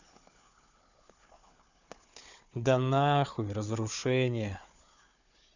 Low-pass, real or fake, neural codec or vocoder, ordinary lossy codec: 7.2 kHz; fake; codec, 16 kHz, 4 kbps, FunCodec, trained on Chinese and English, 50 frames a second; none